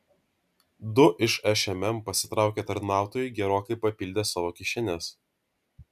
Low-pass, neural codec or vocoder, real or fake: 14.4 kHz; none; real